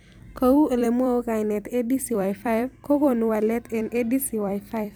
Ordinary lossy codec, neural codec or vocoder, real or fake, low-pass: none; vocoder, 44.1 kHz, 128 mel bands every 256 samples, BigVGAN v2; fake; none